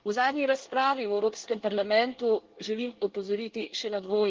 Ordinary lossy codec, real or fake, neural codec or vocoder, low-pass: Opus, 16 kbps; fake; codec, 24 kHz, 1 kbps, SNAC; 7.2 kHz